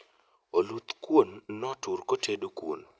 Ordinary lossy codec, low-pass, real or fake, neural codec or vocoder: none; none; real; none